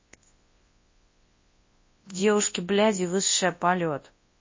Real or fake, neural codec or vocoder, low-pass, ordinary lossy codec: fake; codec, 24 kHz, 0.9 kbps, WavTokenizer, large speech release; 7.2 kHz; MP3, 32 kbps